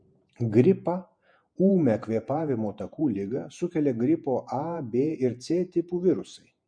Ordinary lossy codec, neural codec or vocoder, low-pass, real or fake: MP3, 48 kbps; vocoder, 48 kHz, 128 mel bands, Vocos; 9.9 kHz; fake